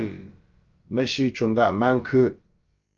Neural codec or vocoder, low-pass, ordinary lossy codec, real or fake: codec, 16 kHz, about 1 kbps, DyCAST, with the encoder's durations; 7.2 kHz; Opus, 24 kbps; fake